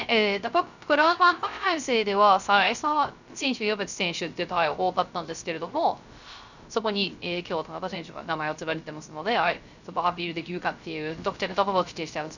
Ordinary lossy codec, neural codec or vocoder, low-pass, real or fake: none; codec, 16 kHz, 0.3 kbps, FocalCodec; 7.2 kHz; fake